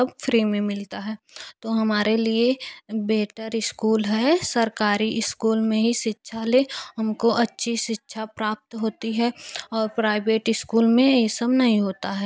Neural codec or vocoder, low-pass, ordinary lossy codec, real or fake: none; none; none; real